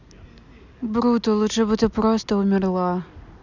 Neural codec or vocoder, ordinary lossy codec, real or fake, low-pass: none; none; real; 7.2 kHz